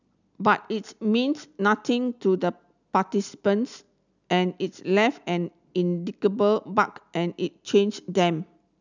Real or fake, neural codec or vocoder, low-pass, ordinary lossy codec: real; none; 7.2 kHz; none